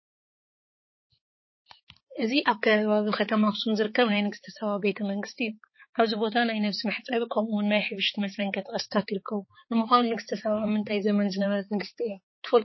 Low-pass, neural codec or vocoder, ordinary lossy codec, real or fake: 7.2 kHz; codec, 16 kHz, 4 kbps, X-Codec, HuBERT features, trained on balanced general audio; MP3, 24 kbps; fake